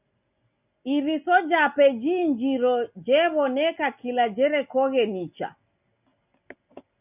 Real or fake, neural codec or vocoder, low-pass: real; none; 3.6 kHz